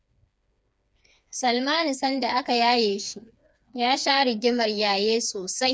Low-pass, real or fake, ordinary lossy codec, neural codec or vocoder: none; fake; none; codec, 16 kHz, 4 kbps, FreqCodec, smaller model